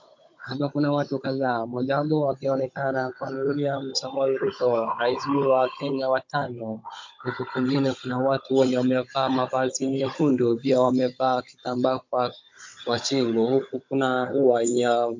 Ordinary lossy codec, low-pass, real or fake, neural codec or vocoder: MP3, 48 kbps; 7.2 kHz; fake; codec, 16 kHz, 16 kbps, FunCodec, trained on Chinese and English, 50 frames a second